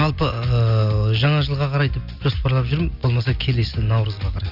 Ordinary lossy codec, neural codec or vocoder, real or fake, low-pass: Opus, 64 kbps; none; real; 5.4 kHz